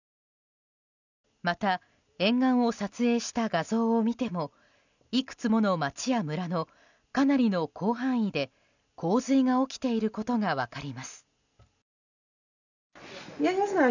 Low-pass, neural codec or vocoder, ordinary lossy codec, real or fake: 7.2 kHz; none; none; real